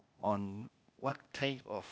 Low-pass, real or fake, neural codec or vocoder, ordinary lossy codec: none; fake; codec, 16 kHz, 0.8 kbps, ZipCodec; none